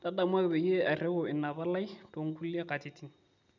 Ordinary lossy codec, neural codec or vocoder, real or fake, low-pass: none; none; real; 7.2 kHz